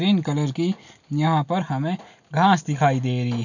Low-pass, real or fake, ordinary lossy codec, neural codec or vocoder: 7.2 kHz; real; none; none